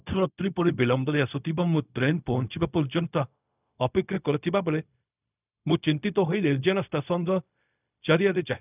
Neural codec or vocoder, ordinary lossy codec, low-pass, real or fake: codec, 16 kHz, 0.4 kbps, LongCat-Audio-Codec; none; 3.6 kHz; fake